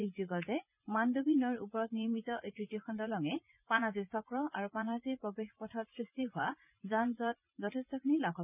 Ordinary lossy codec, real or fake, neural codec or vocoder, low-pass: none; fake; vocoder, 44.1 kHz, 80 mel bands, Vocos; 3.6 kHz